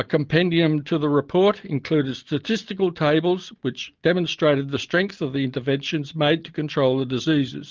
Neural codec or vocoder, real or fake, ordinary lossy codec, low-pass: none; real; Opus, 32 kbps; 7.2 kHz